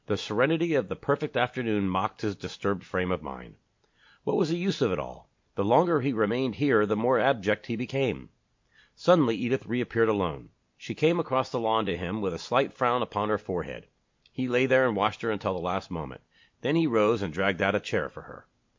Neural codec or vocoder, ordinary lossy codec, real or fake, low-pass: none; MP3, 48 kbps; real; 7.2 kHz